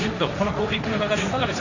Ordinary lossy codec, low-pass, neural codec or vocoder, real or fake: none; 7.2 kHz; codec, 16 kHz, 1.1 kbps, Voila-Tokenizer; fake